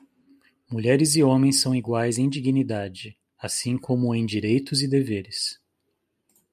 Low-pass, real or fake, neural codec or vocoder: 14.4 kHz; real; none